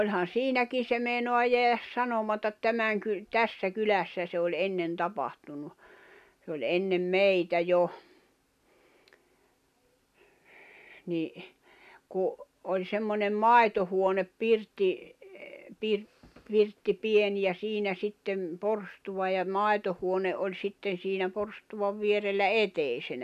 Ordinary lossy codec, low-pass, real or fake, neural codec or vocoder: MP3, 96 kbps; 14.4 kHz; real; none